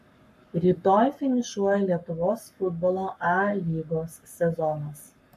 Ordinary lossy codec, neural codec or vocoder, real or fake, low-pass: MP3, 64 kbps; codec, 44.1 kHz, 7.8 kbps, Pupu-Codec; fake; 14.4 kHz